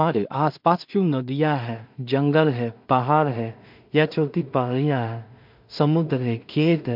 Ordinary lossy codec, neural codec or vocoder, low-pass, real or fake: MP3, 48 kbps; codec, 16 kHz in and 24 kHz out, 0.4 kbps, LongCat-Audio-Codec, two codebook decoder; 5.4 kHz; fake